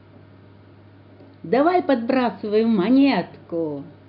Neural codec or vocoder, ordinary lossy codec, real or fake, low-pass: none; none; real; 5.4 kHz